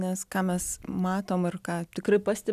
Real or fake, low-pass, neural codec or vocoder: real; 14.4 kHz; none